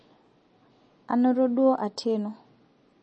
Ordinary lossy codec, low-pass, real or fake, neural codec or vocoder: MP3, 32 kbps; 10.8 kHz; real; none